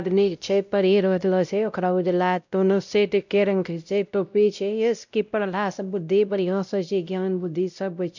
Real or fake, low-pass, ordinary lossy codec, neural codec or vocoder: fake; 7.2 kHz; none; codec, 16 kHz, 0.5 kbps, X-Codec, WavLM features, trained on Multilingual LibriSpeech